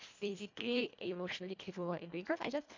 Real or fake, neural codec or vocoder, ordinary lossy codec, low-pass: fake; codec, 24 kHz, 1.5 kbps, HILCodec; MP3, 64 kbps; 7.2 kHz